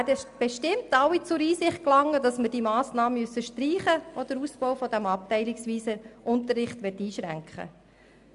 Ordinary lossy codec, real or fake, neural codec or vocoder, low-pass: AAC, 96 kbps; real; none; 10.8 kHz